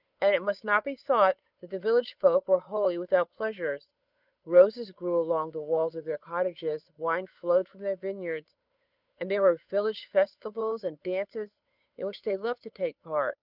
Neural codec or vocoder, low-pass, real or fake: codec, 16 kHz, 8 kbps, FunCodec, trained on Chinese and English, 25 frames a second; 5.4 kHz; fake